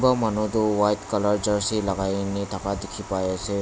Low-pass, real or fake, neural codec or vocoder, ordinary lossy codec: none; real; none; none